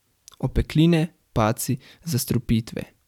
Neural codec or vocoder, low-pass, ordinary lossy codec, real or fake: vocoder, 44.1 kHz, 128 mel bands every 512 samples, BigVGAN v2; 19.8 kHz; none; fake